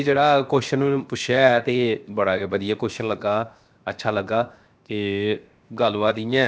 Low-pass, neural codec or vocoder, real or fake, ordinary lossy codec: none; codec, 16 kHz, 0.7 kbps, FocalCodec; fake; none